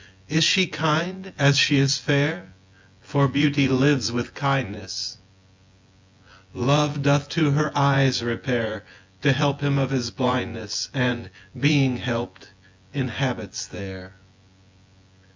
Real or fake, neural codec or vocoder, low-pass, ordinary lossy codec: fake; vocoder, 24 kHz, 100 mel bands, Vocos; 7.2 kHz; MP3, 64 kbps